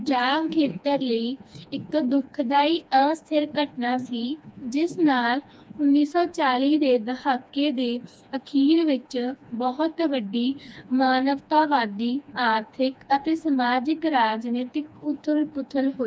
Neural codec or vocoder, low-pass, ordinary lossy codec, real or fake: codec, 16 kHz, 2 kbps, FreqCodec, smaller model; none; none; fake